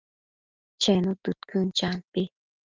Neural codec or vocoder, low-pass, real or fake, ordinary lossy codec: none; 7.2 kHz; real; Opus, 24 kbps